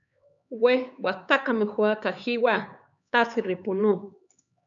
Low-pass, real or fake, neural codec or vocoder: 7.2 kHz; fake; codec, 16 kHz, 4 kbps, X-Codec, HuBERT features, trained on LibriSpeech